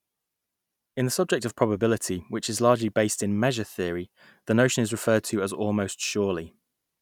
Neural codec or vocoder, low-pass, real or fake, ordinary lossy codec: none; 19.8 kHz; real; none